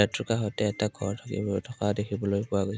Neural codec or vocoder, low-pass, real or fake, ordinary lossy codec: none; none; real; none